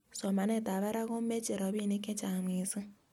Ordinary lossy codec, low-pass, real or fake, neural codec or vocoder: MP3, 96 kbps; 19.8 kHz; real; none